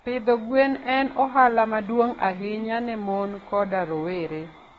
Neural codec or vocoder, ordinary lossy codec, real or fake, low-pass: codec, 44.1 kHz, 7.8 kbps, DAC; AAC, 24 kbps; fake; 19.8 kHz